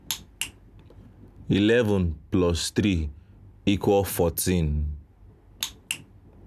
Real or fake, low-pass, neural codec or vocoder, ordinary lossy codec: real; 14.4 kHz; none; none